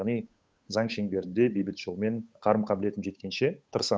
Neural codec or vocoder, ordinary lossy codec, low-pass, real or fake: codec, 16 kHz, 8 kbps, FunCodec, trained on Chinese and English, 25 frames a second; none; none; fake